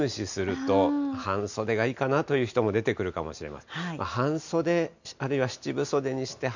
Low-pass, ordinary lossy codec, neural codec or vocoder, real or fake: 7.2 kHz; none; none; real